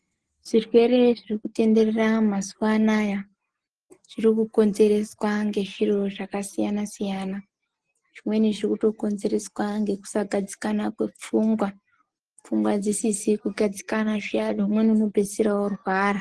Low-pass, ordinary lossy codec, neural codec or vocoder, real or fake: 10.8 kHz; Opus, 16 kbps; none; real